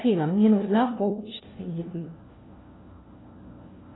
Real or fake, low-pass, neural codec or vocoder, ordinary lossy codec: fake; 7.2 kHz; codec, 16 kHz, 1 kbps, FunCodec, trained on LibriTTS, 50 frames a second; AAC, 16 kbps